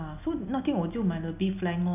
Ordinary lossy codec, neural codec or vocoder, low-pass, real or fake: none; none; 3.6 kHz; real